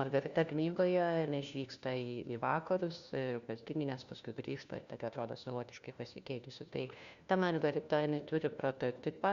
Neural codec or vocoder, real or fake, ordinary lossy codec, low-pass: codec, 16 kHz, 1 kbps, FunCodec, trained on LibriTTS, 50 frames a second; fake; Opus, 64 kbps; 7.2 kHz